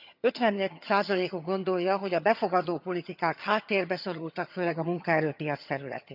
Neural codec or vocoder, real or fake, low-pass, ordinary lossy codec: vocoder, 22.05 kHz, 80 mel bands, HiFi-GAN; fake; 5.4 kHz; none